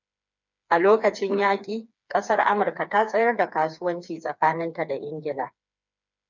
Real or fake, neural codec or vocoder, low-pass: fake; codec, 16 kHz, 4 kbps, FreqCodec, smaller model; 7.2 kHz